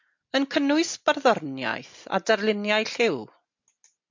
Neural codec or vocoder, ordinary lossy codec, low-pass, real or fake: none; MP3, 48 kbps; 7.2 kHz; real